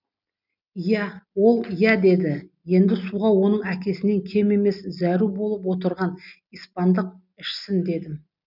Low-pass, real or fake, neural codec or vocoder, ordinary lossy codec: 5.4 kHz; real; none; none